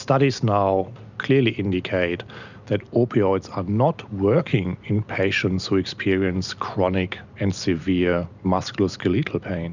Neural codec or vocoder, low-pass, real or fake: none; 7.2 kHz; real